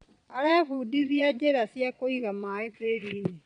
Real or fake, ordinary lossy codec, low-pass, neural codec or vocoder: fake; none; 9.9 kHz; vocoder, 22.05 kHz, 80 mel bands, Vocos